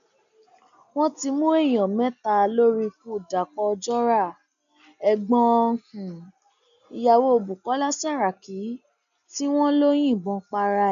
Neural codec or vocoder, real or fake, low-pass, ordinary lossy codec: none; real; 7.2 kHz; none